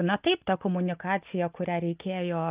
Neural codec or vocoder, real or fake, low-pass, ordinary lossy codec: none; real; 3.6 kHz; Opus, 32 kbps